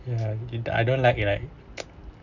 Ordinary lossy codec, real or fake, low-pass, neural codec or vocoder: none; real; 7.2 kHz; none